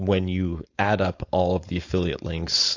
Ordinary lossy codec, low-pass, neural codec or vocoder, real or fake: AAC, 48 kbps; 7.2 kHz; codec, 16 kHz, 4.8 kbps, FACodec; fake